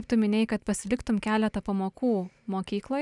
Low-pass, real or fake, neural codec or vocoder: 10.8 kHz; real; none